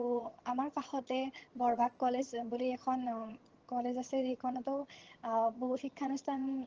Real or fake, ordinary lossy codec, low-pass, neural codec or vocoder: fake; Opus, 16 kbps; 7.2 kHz; vocoder, 22.05 kHz, 80 mel bands, HiFi-GAN